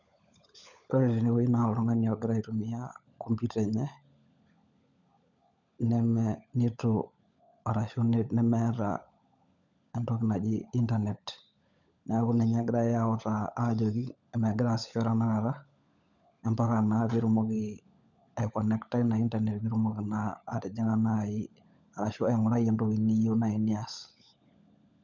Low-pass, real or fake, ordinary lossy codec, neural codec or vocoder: 7.2 kHz; fake; none; codec, 16 kHz, 16 kbps, FunCodec, trained on LibriTTS, 50 frames a second